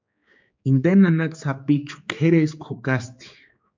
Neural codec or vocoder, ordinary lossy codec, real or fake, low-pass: codec, 16 kHz, 4 kbps, X-Codec, HuBERT features, trained on general audio; MP3, 64 kbps; fake; 7.2 kHz